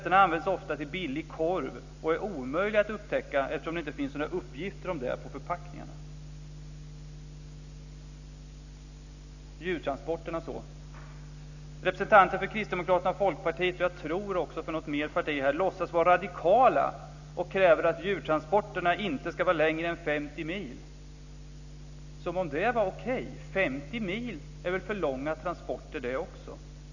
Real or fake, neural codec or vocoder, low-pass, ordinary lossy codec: real; none; 7.2 kHz; none